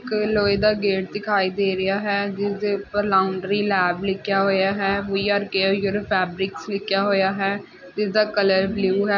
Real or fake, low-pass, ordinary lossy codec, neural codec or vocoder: real; 7.2 kHz; none; none